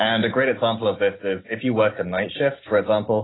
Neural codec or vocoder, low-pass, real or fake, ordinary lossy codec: none; 7.2 kHz; real; AAC, 16 kbps